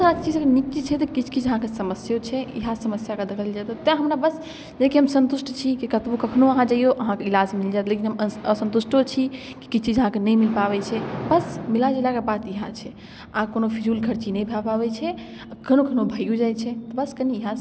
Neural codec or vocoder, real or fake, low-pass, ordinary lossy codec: none; real; none; none